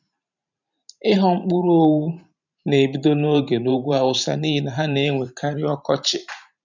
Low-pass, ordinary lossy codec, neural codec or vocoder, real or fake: 7.2 kHz; none; vocoder, 44.1 kHz, 128 mel bands every 512 samples, BigVGAN v2; fake